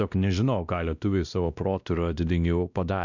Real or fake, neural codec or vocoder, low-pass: fake; codec, 16 kHz, 1 kbps, X-Codec, WavLM features, trained on Multilingual LibriSpeech; 7.2 kHz